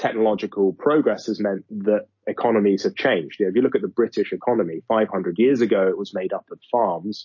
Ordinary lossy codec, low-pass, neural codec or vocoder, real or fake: MP3, 32 kbps; 7.2 kHz; none; real